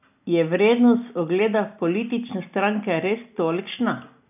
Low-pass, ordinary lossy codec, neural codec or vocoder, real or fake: 3.6 kHz; none; none; real